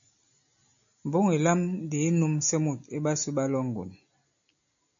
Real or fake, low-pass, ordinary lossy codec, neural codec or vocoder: real; 7.2 kHz; MP3, 64 kbps; none